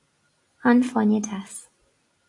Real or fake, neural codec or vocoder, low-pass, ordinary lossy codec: real; none; 10.8 kHz; AAC, 48 kbps